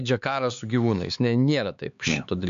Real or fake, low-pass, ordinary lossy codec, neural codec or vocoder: fake; 7.2 kHz; MP3, 64 kbps; codec, 16 kHz, 4 kbps, X-Codec, HuBERT features, trained on LibriSpeech